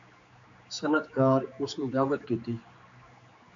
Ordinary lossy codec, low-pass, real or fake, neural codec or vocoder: MP3, 48 kbps; 7.2 kHz; fake; codec, 16 kHz, 4 kbps, X-Codec, HuBERT features, trained on general audio